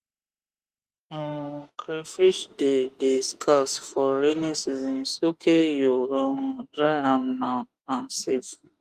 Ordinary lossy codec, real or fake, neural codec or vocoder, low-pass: Opus, 32 kbps; fake; autoencoder, 48 kHz, 32 numbers a frame, DAC-VAE, trained on Japanese speech; 14.4 kHz